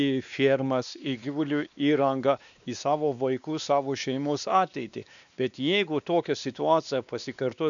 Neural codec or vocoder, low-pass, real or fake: codec, 16 kHz, 2 kbps, X-Codec, WavLM features, trained on Multilingual LibriSpeech; 7.2 kHz; fake